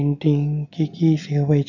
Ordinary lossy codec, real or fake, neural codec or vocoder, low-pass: none; real; none; 7.2 kHz